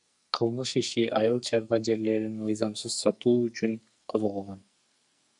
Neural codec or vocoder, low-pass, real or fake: codec, 44.1 kHz, 2.6 kbps, SNAC; 10.8 kHz; fake